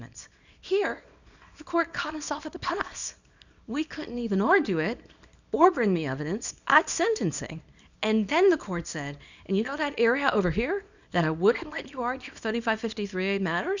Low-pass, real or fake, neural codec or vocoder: 7.2 kHz; fake; codec, 24 kHz, 0.9 kbps, WavTokenizer, small release